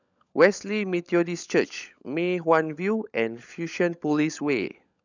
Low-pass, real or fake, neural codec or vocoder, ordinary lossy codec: 7.2 kHz; fake; codec, 16 kHz, 16 kbps, FunCodec, trained on LibriTTS, 50 frames a second; none